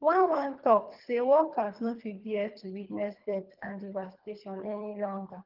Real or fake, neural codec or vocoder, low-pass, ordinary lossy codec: fake; codec, 24 kHz, 3 kbps, HILCodec; 5.4 kHz; Opus, 32 kbps